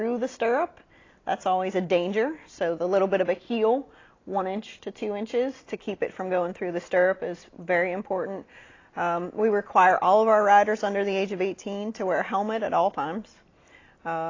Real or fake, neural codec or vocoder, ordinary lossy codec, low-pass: real; none; AAC, 32 kbps; 7.2 kHz